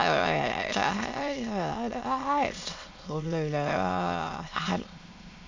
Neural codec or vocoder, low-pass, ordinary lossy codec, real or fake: autoencoder, 22.05 kHz, a latent of 192 numbers a frame, VITS, trained on many speakers; 7.2 kHz; MP3, 64 kbps; fake